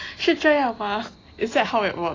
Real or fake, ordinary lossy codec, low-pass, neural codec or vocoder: real; AAC, 32 kbps; 7.2 kHz; none